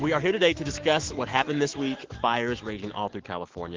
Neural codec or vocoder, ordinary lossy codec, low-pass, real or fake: autoencoder, 48 kHz, 128 numbers a frame, DAC-VAE, trained on Japanese speech; Opus, 16 kbps; 7.2 kHz; fake